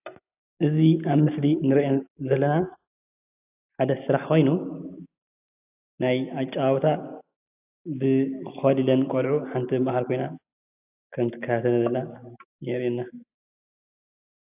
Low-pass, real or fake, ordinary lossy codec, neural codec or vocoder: 3.6 kHz; real; AAC, 32 kbps; none